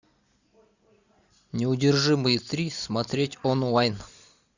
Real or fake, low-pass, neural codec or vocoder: real; 7.2 kHz; none